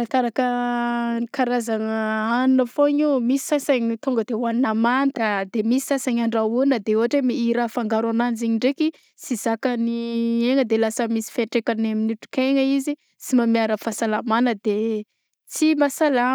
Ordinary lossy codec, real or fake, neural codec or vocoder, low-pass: none; real; none; none